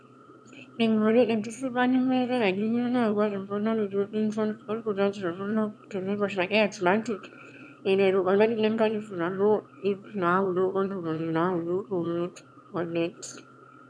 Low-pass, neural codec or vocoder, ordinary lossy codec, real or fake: none; autoencoder, 22.05 kHz, a latent of 192 numbers a frame, VITS, trained on one speaker; none; fake